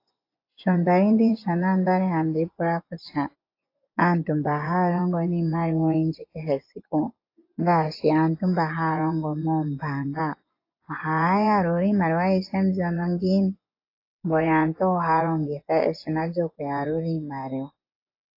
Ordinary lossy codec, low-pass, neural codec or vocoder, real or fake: AAC, 32 kbps; 5.4 kHz; vocoder, 24 kHz, 100 mel bands, Vocos; fake